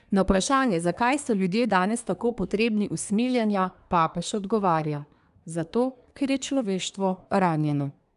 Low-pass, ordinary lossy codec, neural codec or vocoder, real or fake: 10.8 kHz; none; codec, 24 kHz, 1 kbps, SNAC; fake